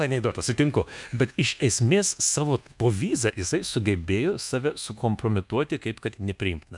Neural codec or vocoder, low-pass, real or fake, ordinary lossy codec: codec, 24 kHz, 1.2 kbps, DualCodec; 10.8 kHz; fake; MP3, 96 kbps